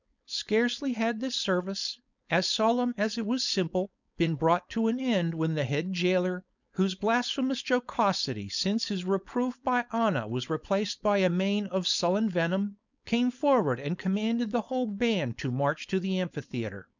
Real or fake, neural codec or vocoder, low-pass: fake; codec, 16 kHz, 4.8 kbps, FACodec; 7.2 kHz